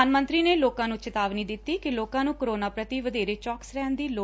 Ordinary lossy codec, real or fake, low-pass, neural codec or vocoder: none; real; none; none